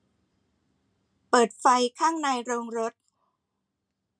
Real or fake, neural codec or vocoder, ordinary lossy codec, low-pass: real; none; none; 9.9 kHz